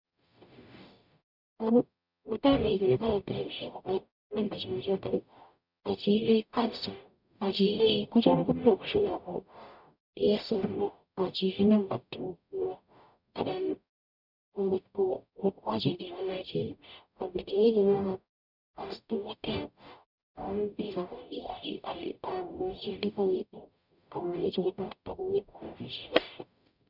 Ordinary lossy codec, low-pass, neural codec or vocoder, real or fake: none; 5.4 kHz; codec, 44.1 kHz, 0.9 kbps, DAC; fake